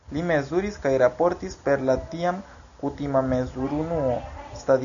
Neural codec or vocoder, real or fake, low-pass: none; real; 7.2 kHz